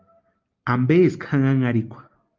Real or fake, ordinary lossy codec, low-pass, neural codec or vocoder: real; Opus, 24 kbps; 7.2 kHz; none